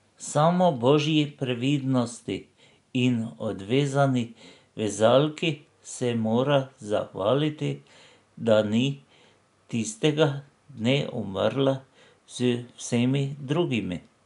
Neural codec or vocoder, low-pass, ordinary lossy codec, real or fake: none; 10.8 kHz; none; real